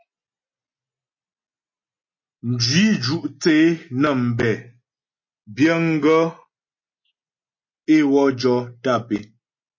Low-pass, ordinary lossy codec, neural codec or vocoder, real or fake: 7.2 kHz; MP3, 32 kbps; none; real